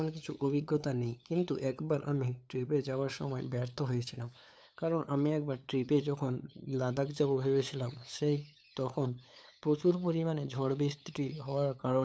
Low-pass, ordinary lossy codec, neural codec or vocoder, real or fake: none; none; codec, 16 kHz, 8 kbps, FunCodec, trained on LibriTTS, 25 frames a second; fake